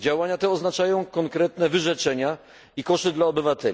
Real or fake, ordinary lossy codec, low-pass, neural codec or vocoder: real; none; none; none